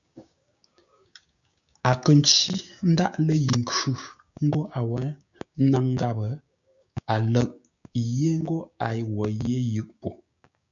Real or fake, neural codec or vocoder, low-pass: fake; codec, 16 kHz, 6 kbps, DAC; 7.2 kHz